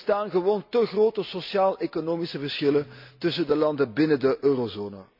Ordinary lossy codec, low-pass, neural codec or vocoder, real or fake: none; 5.4 kHz; none; real